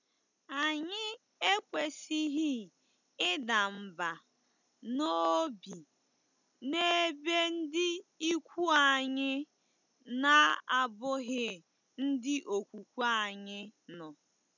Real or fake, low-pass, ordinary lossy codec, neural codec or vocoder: real; 7.2 kHz; none; none